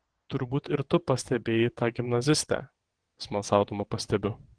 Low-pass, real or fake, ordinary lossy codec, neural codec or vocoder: 9.9 kHz; fake; Opus, 16 kbps; vocoder, 22.05 kHz, 80 mel bands, WaveNeXt